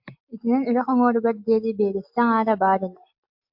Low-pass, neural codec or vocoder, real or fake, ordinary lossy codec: 5.4 kHz; none; real; MP3, 48 kbps